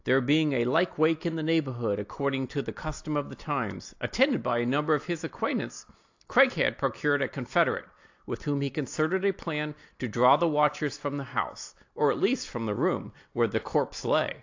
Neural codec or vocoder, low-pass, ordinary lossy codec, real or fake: none; 7.2 kHz; AAC, 48 kbps; real